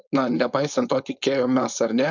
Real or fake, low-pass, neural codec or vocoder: fake; 7.2 kHz; codec, 16 kHz, 4.8 kbps, FACodec